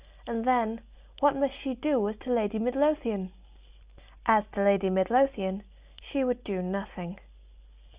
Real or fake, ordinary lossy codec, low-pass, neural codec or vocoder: real; Opus, 64 kbps; 3.6 kHz; none